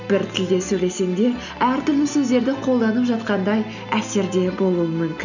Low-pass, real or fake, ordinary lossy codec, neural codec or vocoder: 7.2 kHz; real; none; none